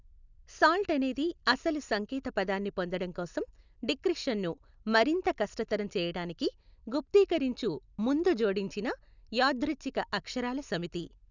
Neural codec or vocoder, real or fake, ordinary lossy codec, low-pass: none; real; none; 7.2 kHz